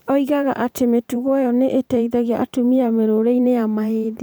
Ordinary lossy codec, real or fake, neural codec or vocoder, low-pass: none; fake; vocoder, 44.1 kHz, 128 mel bands every 512 samples, BigVGAN v2; none